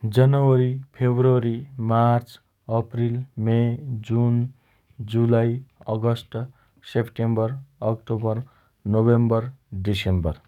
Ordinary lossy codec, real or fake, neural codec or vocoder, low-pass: none; fake; codec, 44.1 kHz, 7.8 kbps, DAC; 19.8 kHz